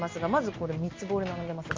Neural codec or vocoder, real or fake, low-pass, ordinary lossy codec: none; real; 7.2 kHz; Opus, 24 kbps